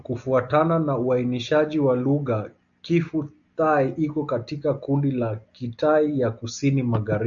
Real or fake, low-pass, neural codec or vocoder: real; 7.2 kHz; none